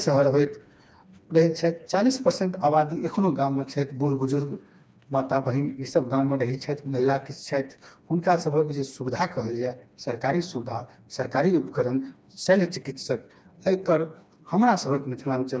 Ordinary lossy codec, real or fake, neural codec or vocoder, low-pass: none; fake; codec, 16 kHz, 2 kbps, FreqCodec, smaller model; none